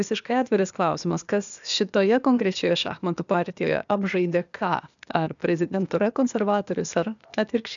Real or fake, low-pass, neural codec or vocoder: fake; 7.2 kHz; codec, 16 kHz, 0.8 kbps, ZipCodec